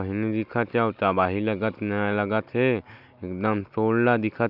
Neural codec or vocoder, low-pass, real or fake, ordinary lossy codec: none; 5.4 kHz; real; AAC, 48 kbps